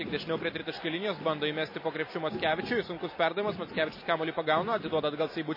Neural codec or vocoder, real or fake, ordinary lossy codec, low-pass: none; real; MP3, 24 kbps; 5.4 kHz